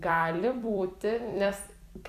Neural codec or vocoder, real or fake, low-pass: vocoder, 44.1 kHz, 128 mel bands every 256 samples, BigVGAN v2; fake; 14.4 kHz